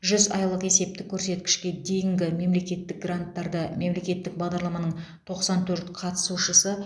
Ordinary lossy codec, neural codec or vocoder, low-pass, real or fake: none; none; none; real